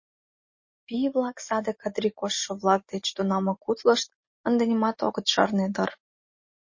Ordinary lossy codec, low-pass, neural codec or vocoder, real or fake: MP3, 32 kbps; 7.2 kHz; none; real